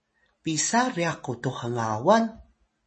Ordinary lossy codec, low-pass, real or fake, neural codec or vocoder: MP3, 32 kbps; 10.8 kHz; real; none